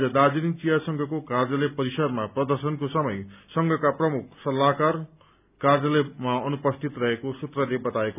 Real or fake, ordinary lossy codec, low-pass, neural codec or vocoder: real; none; 3.6 kHz; none